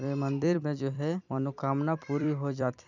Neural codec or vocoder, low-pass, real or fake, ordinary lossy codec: vocoder, 44.1 kHz, 128 mel bands every 256 samples, BigVGAN v2; 7.2 kHz; fake; none